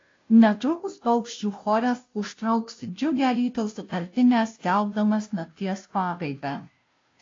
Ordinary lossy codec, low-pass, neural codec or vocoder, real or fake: AAC, 32 kbps; 7.2 kHz; codec, 16 kHz, 0.5 kbps, FunCodec, trained on Chinese and English, 25 frames a second; fake